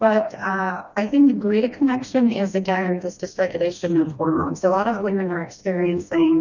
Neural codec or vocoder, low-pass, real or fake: codec, 16 kHz, 1 kbps, FreqCodec, smaller model; 7.2 kHz; fake